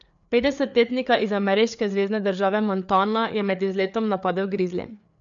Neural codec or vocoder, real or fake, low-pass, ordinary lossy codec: codec, 16 kHz, 4 kbps, FreqCodec, larger model; fake; 7.2 kHz; none